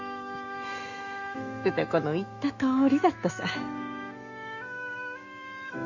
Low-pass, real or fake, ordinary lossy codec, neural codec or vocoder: 7.2 kHz; fake; Opus, 64 kbps; autoencoder, 48 kHz, 128 numbers a frame, DAC-VAE, trained on Japanese speech